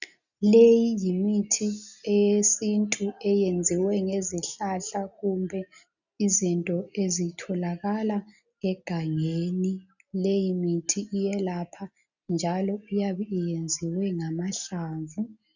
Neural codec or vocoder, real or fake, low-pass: none; real; 7.2 kHz